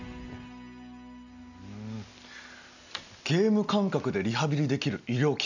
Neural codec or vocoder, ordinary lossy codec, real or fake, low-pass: none; none; real; 7.2 kHz